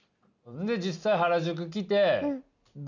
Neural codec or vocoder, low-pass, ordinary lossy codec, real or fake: none; 7.2 kHz; none; real